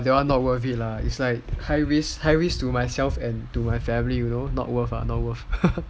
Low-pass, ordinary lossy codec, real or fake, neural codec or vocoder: none; none; real; none